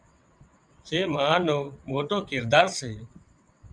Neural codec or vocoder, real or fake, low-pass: vocoder, 22.05 kHz, 80 mel bands, WaveNeXt; fake; 9.9 kHz